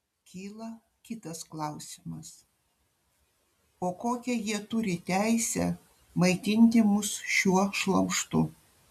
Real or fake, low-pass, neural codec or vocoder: real; 14.4 kHz; none